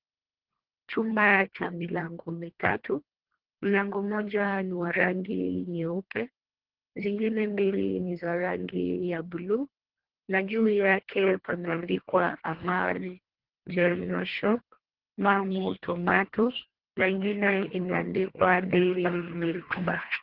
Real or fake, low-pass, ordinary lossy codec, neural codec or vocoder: fake; 5.4 kHz; Opus, 16 kbps; codec, 24 kHz, 1.5 kbps, HILCodec